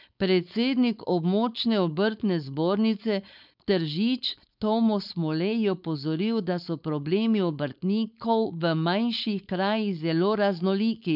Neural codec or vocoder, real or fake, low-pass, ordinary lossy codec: codec, 16 kHz, 4.8 kbps, FACodec; fake; 5.4 kHz; none